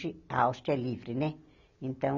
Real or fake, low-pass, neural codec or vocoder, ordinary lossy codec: real; 7.2 kHz; none; none